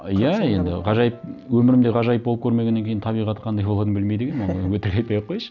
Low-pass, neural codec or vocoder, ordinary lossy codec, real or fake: 7.2 kHz; none; none; real